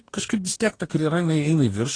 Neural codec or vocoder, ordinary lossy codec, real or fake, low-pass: codec, 44.1 kHz, 2.6 kbps, DAC; AAC, 32 kbps; fake; 9.9 kHz